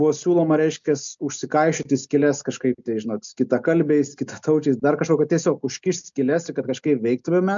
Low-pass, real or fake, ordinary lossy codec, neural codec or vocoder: 7.2 kHz; real; MP3, 64 kbps; none